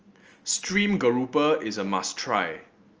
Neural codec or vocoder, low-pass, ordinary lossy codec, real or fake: none; 7.2 kHz; Opus, 24 kbps; real